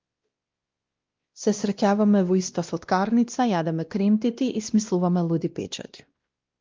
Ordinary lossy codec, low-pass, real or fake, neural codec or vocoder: Opus, 32 kbps; 7.2 kHz; fake; codec, 16 kHz, 1 kbps, X-Codec, WavLM features, trained on Multilingual LibriSpeech